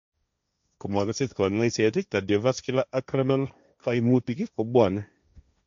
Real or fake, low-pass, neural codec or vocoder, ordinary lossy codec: fake; 7.2 kHz; codec, 16 kHz, 1.1 kbps, Voila-Tokenizer; MP3, 64 kbps